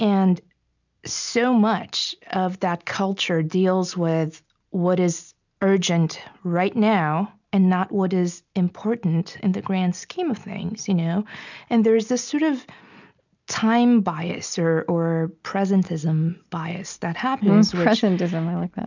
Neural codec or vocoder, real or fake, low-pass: none; real; 7.2 kHz